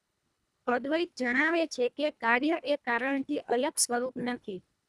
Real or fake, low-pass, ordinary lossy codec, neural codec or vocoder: fake; none; none; codec, 24 kHz, 1.5 kbps, HILCodec